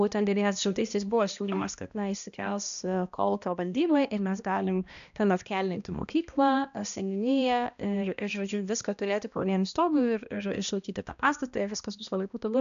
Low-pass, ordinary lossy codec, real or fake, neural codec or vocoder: 7.2 kHz; AAC, 96 kbps; fake; codec, 16 kHz, 1 kbps, X-Codec, HuBERT features, trained on balanced general audio